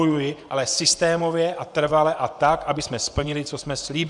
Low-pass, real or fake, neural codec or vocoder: 10.8 kHz; fake; vocoder, 24 kHz, 100 mel bands, Vocos